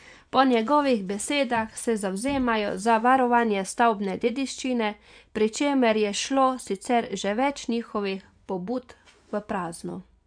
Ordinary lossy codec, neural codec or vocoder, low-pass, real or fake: none; vocoder, 24 kHz, 100 mel bands, Vocos; 9.9 kHz; fake